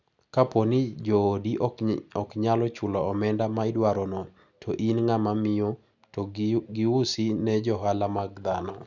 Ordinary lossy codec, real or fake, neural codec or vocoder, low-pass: none; real; none; 7.2 kHz